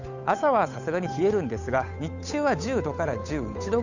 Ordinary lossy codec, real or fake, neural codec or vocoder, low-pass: none; fake; codec, 16 kHz, 8 kbps, FunCodec, trained on Chinese and English, 25 frames a second; 7.2 kHz